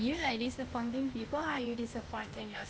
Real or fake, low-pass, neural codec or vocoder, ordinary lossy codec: fake; none; codec, 16 kHz, 0.8 kbps, ZipCodec; none